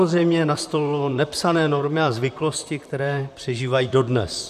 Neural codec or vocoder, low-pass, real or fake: vocoder, 44.1 kHz, 128 mel bands, Pupu-Vocoder; 14.4 kHz; fake